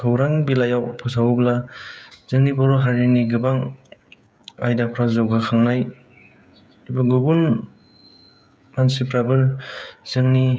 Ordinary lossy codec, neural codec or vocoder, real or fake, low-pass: none; codec, 16 kHz, 16 kbps, FreqCodec, smaller model; fake; none